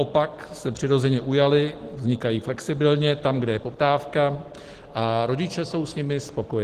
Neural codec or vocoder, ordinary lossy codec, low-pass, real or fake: none; Opus, 16 kbps; 14.4 kHz; real